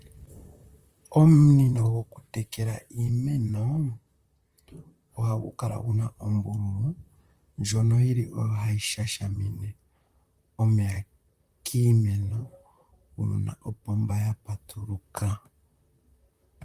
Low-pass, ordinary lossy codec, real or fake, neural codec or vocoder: 14.4 kHz; Opus, 32 kbps; fake; vocoder, 44.1 kHz, 128 mel bands, Pupu-Vocoder